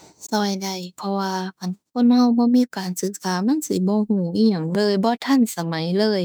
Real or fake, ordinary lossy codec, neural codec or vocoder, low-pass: fake; none; autoencoder, 48 kHz, 32 numbers a frame, DAC-VAE, trained on Japanese speech; none